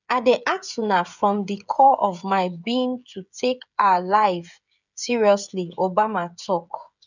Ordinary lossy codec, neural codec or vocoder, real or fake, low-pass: none; codec, 16 kHz, 16 kbps, FreqCodec, smaller model; fake; 7.2 kHz